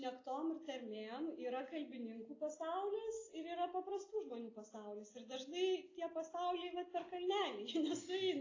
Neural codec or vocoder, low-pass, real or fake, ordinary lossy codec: none; 7.2 kHz; real; AAC, 32 kbps